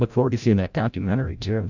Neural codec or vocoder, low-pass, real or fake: codec, 16 kHz, 0.5 kbps, FreqCodec, larger model; 7.2 kHz; fake